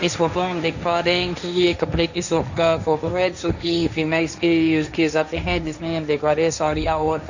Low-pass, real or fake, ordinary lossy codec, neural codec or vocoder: 7.2 kHz; fake; none; codec, 16 kHz, 1.1 kbps, Voila-Tokenizer